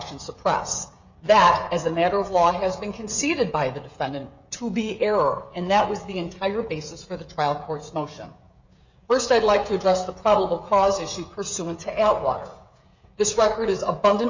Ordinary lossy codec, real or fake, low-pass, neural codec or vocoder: Opus, 64 kbps; fake; 7.2 kHz; codec, 16 kHz, 16 kbps, FreqCodec, smaller model